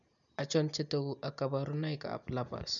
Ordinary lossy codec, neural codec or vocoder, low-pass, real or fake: none; none; 7.2 kHz; real